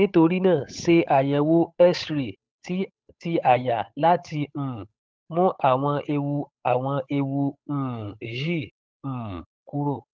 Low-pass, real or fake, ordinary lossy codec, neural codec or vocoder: none; fake; none; codec, 16 kHz, 8 kbps, FunCodec, trained on Chinese and English, 25 frames a second